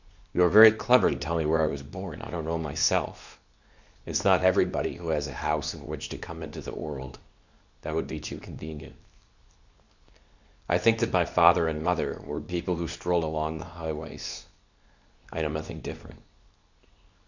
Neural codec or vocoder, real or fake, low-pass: codec, 24 kHz, 0.9 kbps, WavTokenizer, medium speech release version 2; fake; 7.2 kHz